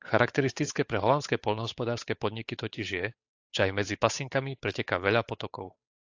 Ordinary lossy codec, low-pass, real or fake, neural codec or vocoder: AAC, 48 kbps; 7.2 kHz; fake; codec, 16 kHz, 8 kbps, FunCodec, trained on Chinese and English, 25 frames a second